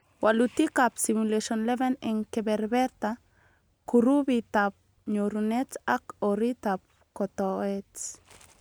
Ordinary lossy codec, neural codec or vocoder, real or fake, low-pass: none; none; real; none